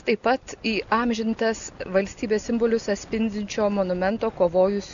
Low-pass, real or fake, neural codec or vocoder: 7.2 kHz; real; none